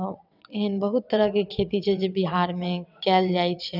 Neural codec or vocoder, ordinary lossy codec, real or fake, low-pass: vocoder, 22.05 kHz, 80 mel bands, WaveNeXt; none; fake; 5.4 kHz